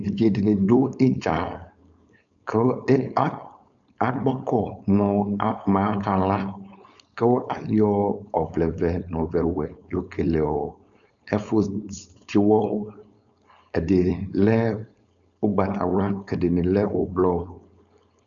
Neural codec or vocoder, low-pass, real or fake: codec, 16 kHz, 4.8 kbps, FACodec; 7.2 kHz; fake